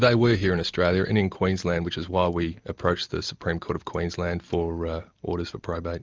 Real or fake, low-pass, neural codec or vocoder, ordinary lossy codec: real; 7.2 kHz; none; Opus, 24 kbps